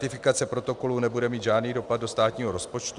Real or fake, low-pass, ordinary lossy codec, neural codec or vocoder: real; 10.8 kHz; MP3, 96 kbps; none